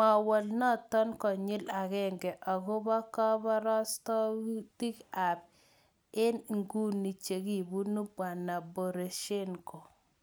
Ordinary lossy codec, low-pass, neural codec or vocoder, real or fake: none; none; none; real